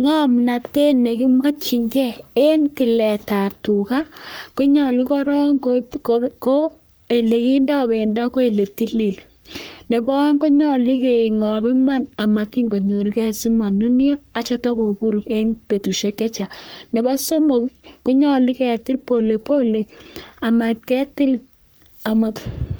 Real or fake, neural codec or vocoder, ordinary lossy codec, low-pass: fake; codec, 44.1 kHz, 3.4 kbps, Pupu-Codec; none; none